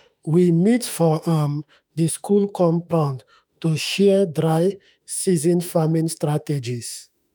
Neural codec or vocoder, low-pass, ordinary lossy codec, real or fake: autoencoder, 48 kHz, 32 numbers a frame, DAC-VAE, trained on Japanese speech; none; none; fake